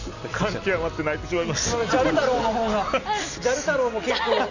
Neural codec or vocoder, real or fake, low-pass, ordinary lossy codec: none; real; 7.2 kHz; none